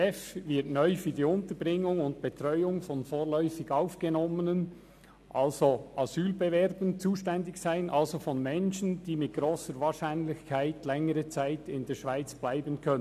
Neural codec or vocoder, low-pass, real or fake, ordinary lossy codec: none; 14.4 kHz; real; none